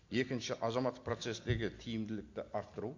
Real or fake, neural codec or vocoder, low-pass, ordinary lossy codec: real; none; 7.2 kHz; MP3, 48 kbps